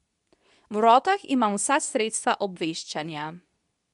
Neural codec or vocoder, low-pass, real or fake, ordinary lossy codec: codec, 24 kHz, 0.9 kbps, WavTokenizer, medium speech release version 2; 10.8 kHz; fake; none